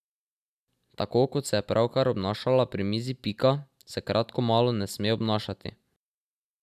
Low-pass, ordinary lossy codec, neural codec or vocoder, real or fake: 14.4 kHz; none; none; real